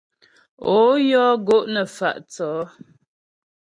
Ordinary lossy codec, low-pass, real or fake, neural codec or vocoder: MP3, 48 kbps; 9.9 kHz; real; none